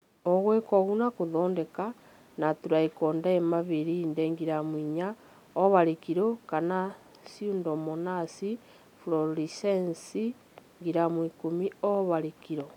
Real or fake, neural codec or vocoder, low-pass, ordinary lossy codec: real; none; 19.8 kHz; none